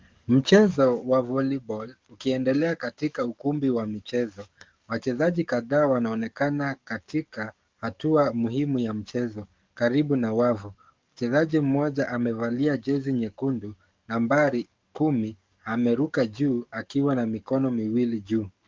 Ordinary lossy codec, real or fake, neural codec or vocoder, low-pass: Opus, 32 kbps; fake; codec, 44.1 kHz, 7.8 kbps, DAC; 7.2 kHz